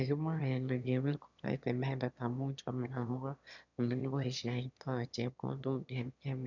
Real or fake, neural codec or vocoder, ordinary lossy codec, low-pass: fake; autoencoder, 22.05 kHz, a latent of 192 numbers a frame, VITS, trained on one speaker; none; 7.2 kHz